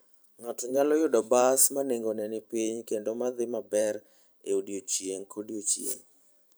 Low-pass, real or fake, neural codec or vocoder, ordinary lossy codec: none; fake; vocoder, 44.1 kHz, 128 mel bands every 512 samples, BigVGAN v2; none